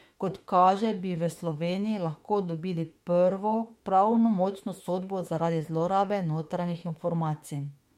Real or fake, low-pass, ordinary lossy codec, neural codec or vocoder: fake; 19.8 kHz; MP3, 64 kbps; autoencoder, 48 kHz, 32 numbers a frame, DAC-VAE, trained on Japanese speech